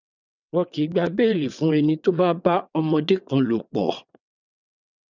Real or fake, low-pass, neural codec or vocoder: fake; 7.2 kHz; vocoder, 22.05 kHz, 80 mel bands, WaveNeXt